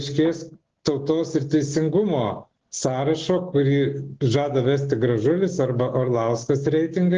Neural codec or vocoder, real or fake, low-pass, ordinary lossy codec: none; real; 7.2 kHz; Opus, 16 kbps